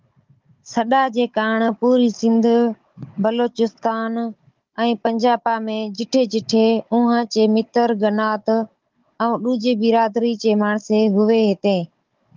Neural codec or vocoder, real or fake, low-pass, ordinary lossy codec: codec, 16 kHz, 16 kbps, FunCodec, trained on Chinese and English, 50 frames a second; fake; 7.2 kHz; Opus, 32 kbps